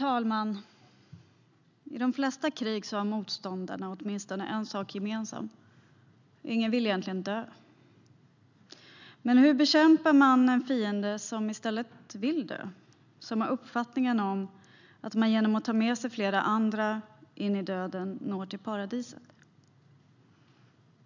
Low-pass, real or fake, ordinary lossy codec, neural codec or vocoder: 7.2 kHz; real; none; none